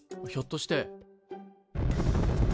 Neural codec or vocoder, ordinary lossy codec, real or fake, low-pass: none; none; real; none